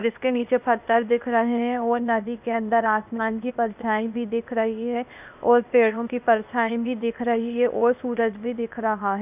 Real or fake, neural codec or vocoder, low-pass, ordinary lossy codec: fake; codec, 16 kHz, 0.8 kbps, ZipCodec; 3.6 kHz; none